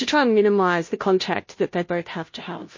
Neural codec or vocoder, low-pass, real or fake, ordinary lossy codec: codec, 16 kHz, 0.5 kbps, FunCodec, trained on Chinese and English, 25 frames a second; 7.2 kHz; fake; MP3, 32 kbps